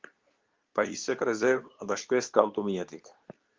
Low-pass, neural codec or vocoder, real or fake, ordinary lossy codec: 7.2 kHz; codec, 24 kHz, 0.9 kbps, WavTokenizer, medium speech release version 2; fake; Opus, 32 kbps